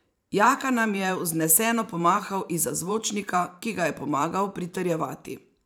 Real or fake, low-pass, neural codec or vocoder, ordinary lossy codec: fake; none; vocoder, 44.1 kHz, 128 mel bands every 512 samples, BigVGAN v2; none